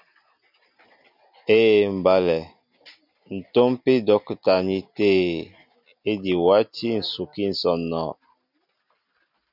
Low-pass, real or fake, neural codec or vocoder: 5.4 kHz; real; none